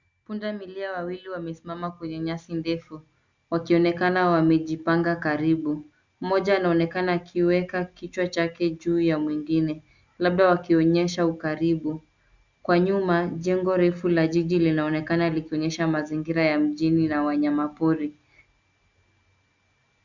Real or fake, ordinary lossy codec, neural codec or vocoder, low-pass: real; Opus, 64 kbps; none; 7.2 kHz